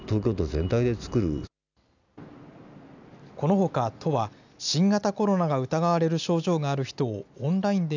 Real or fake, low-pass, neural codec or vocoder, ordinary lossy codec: real; 7.2 kHz; none; none